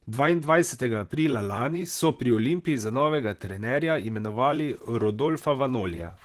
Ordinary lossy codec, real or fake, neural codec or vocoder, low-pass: Opus, 16 kbps; fake; vocoder, 44.1 kHz, 128 mel bands, Pupu-Vocoder; 14.4 kHz